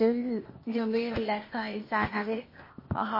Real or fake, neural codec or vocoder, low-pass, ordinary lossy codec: fake; codec, 16 kHz, 0.8 kbps, ZipCodec; 5.4 kHz; MP3, 24 kbps